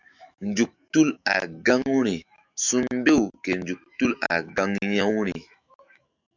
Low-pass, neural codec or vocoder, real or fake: 7.2 kHz; autoencoder, 48 kHz, 128 numbers a frame, DAC-VAE, trained on Japanese speech; fake